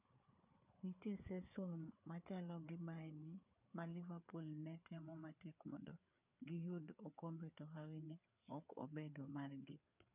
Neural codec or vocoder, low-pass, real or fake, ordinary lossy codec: codec, 16 kHz, 4 kbps, FreqCodec, larger model; 3.6 kHz; fake; none